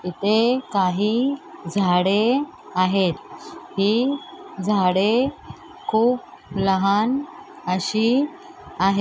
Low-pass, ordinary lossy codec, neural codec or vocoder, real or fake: none; none; none; real